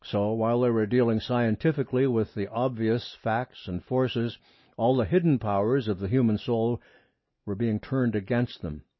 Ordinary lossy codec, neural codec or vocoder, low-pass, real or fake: MP3, 24 kbps; none; 7.2 kHz; real